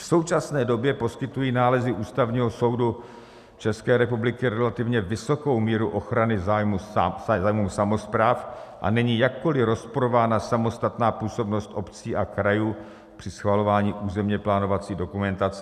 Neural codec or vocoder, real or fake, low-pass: none; real; 14.4 kHz